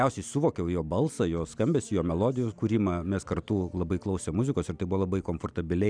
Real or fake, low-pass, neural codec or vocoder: real; 9.9 kHz; none